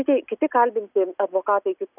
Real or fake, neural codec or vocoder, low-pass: real; none; 3.6 kHz